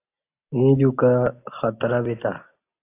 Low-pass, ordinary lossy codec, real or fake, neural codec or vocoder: 3.6 kHz; AAC, 24 kbps; real; none